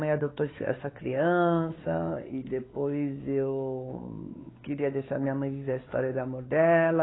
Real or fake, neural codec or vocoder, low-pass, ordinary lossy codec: fake; codec, 16 kHz, 4 kbps, X-Codec, WavLM features, trained on Multilingual LibriSpeech; 7.2 kHz; AAC, 16 kbps